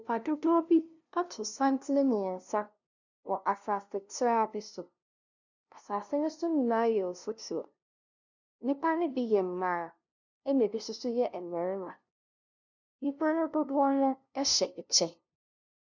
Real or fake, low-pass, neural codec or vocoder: fake; 7.2 kHz; codec, 16 kHz, 0.5 kbps, FunCodec, trained on LibriTTS, 25 frames a second